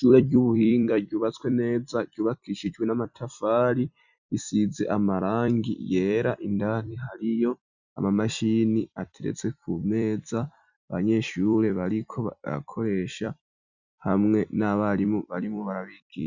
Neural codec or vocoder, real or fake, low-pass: vocoder, 44.1 kHz, 128 mel bands every 256 samples, BigVGAN v2; fake; 7.2 kHz